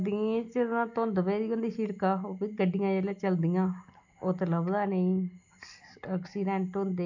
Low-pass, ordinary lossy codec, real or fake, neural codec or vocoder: 7.2 kHz; none; real; none